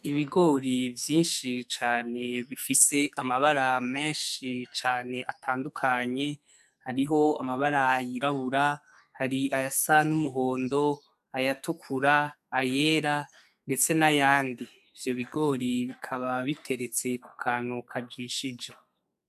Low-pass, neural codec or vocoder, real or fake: 14.4 kHz; codec, 44.1 kHz, 2.6 kbps, SNAC; fake